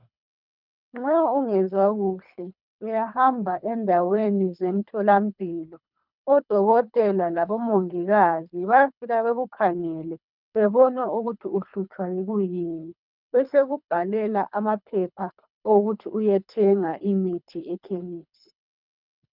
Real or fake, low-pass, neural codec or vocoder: fake; 5.4 kHz; codec, 24 kHz, 3 kbps, HILCodec